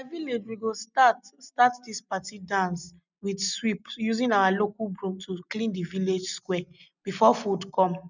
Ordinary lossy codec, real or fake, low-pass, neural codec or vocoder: none; real; 7.2 kHz; none